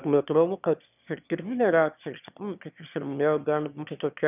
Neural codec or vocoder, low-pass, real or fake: autoencoder, 22.05 kHz, a latent of 192 numbers a frame, VITS, trained on one speaker; 3.6 kHz; fake